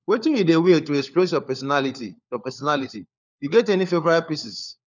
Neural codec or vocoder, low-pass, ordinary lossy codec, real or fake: codec, 16 kHz, 4 kbps, FunCodec, trained on LibriTTS, 50 frames a second; 7.2 kHz; none; fake